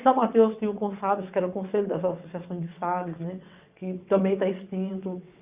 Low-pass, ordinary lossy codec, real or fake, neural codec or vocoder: 3.6 kHz; Opus, 64 kbps; fake; vocoder, 22.05 kHz, 80 mel bands, WaveNeXt